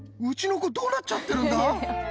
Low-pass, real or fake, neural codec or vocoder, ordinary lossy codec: none; real; none; none